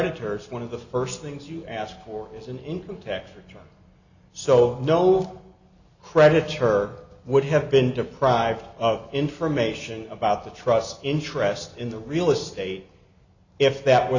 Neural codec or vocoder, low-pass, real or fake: none; 7.2 kHz; real